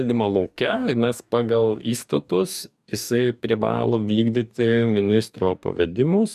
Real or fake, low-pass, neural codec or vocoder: fake; 14.4 kHz; codec, 44.1 kHz, 2.6 kbps, DAC